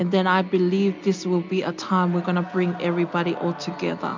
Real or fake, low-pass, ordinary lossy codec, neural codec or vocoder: real; 7.2 kHz; MP3, 64 kbps; none